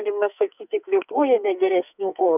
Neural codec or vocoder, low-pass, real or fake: codec, 44.1 kHz, 2.6 kbps, SNAC; 3.6 kHz; fake